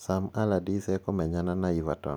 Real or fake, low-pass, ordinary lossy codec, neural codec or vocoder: real; none; none; none